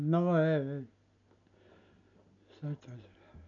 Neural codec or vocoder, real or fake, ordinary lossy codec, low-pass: none; real; none; 7.2 kHz